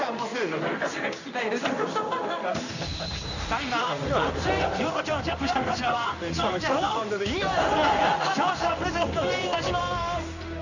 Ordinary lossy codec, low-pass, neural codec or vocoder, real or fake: none; 7.2 kHz; codec, 16 kHz in and 24 kHz out, 1 kbps, XY-Tokenizer; fake